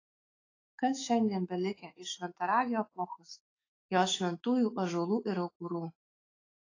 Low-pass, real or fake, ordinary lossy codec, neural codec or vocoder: 7.2 kHz; fake; AAC, 32 kbps; autoencoder, 48 kHz, 128 numbers a frame, DAC-VAE, trained on Japanese speech